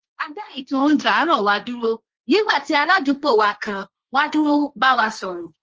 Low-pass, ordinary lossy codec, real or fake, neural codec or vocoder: 7.2 kHz; Opus, 24 kbps; fake; codec, 16 kHz, 1.1 kbps, Voila-Tokenizer